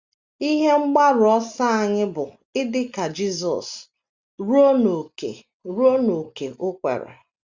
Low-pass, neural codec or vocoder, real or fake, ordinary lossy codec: 7.2 kHz; none; real; Opus, 64 kbps